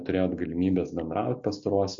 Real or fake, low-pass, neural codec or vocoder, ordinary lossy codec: real; 7.2 kHz; none; MP3, 48 kbps